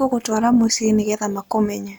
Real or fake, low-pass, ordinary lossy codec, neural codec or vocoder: real; none; none; none